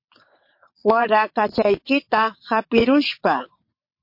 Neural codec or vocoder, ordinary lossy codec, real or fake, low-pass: vocoder, 22.05 kHz, 80 mel bands, WaveNeXt; MP3, 32 kbps; fake; 5.4 kHz